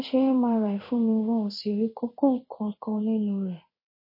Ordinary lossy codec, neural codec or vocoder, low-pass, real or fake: MP3, 24 kbps; codec, 16 kHz in and 24 kHz out, 1 kbps, XY-Tokenizer; 5.4 kHz; fake